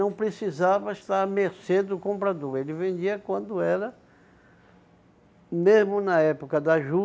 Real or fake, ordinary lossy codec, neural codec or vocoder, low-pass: real; none; none; none